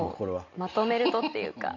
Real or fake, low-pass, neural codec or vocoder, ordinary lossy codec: real; 7.2 kHz; none; none